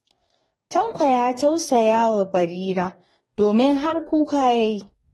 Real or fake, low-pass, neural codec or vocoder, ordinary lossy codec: fake; 19.8 kHz; codec, 44.1 kHz, 2.6 kbps, DAC; AAC, 32 kbps